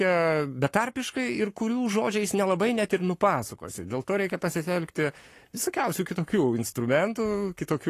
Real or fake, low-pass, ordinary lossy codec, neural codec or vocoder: fake; 14.4 kHz; AAC, 48 kbps; codec, 44.1 kHz, 7.8 kbps, Pupu-Codec